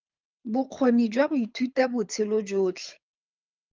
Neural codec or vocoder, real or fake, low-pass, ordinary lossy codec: codec, 24 kHz, 6 kbps, HILCodec; fake; 7.2 kHz; Opus, 32 kbps